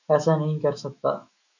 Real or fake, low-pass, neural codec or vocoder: fake; 7.2 kHz; autoencoder, 48 kHz, 128 numbers a frame, DAC-VAE, trained on Japanese speech